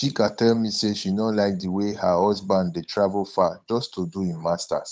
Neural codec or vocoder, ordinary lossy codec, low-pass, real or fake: codec, 16 kHz, 8 kbps, FunCodec, trained on Chinese and English, 25 frames a second; none; none; fake